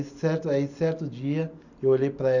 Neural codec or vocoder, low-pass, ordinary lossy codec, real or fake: none; 7.2 kHz; none; real